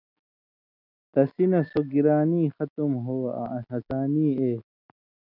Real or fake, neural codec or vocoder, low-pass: real; none; 5.4 kHz